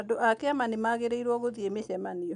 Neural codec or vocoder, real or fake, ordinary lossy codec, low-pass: none; real; none; 9.9 kHz